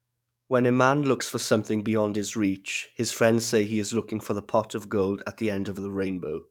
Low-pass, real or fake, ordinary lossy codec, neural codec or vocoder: 19.8 kHz; fake; none; codec, 44.1 kHz, 7.8 kbps, DAC